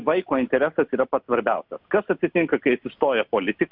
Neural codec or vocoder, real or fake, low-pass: none; real; 5.4 kHz